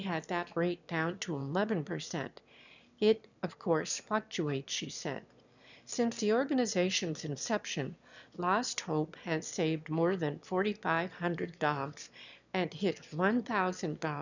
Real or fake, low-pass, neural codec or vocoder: fake; 7.2 kHz; autoencoder, 22.05 kHz, a latent of 192 numbers a frame, VITS, trained on one speaker